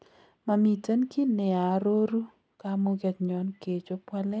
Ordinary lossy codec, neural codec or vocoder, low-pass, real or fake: none; none; none; real